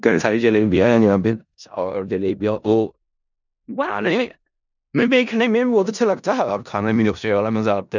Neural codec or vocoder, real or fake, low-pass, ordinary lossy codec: codec, 16 kHz in and 24 kHz out, 0.4 kbps, LongCat-Audio-Codec, four codebook decoder; fake; 7.2 kHz; none